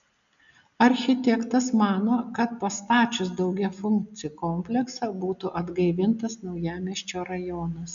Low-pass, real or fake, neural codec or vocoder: 7.2 kHz; real; none